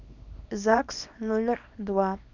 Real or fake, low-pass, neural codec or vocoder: fake; 7.2 kHz; codec, 24 kHz, 0.9 kbps, WavTokenizer, small release